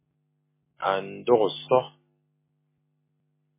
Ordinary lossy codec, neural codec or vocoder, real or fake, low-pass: MP3, 16 kbps; none; real; 3.6 kHz